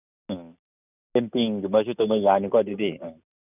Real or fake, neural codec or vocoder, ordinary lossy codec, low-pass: real; none; none; 3.6 kHz